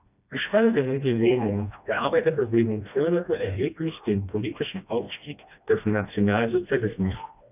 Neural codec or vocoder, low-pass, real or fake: codec, 16 kHz, 1 kbps, FreqCodec, smaller model; 3.6 kHz; fake